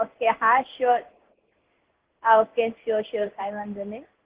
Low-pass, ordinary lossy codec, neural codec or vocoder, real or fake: 3.6 kHz; Opus, 16 kbps; codec, 16 kHz in and 24 kHz out, 1 kbps, XY-Tokenizer; fake